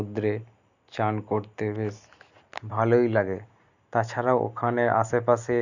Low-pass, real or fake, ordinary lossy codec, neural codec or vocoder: 7.2 kHz; real; none; none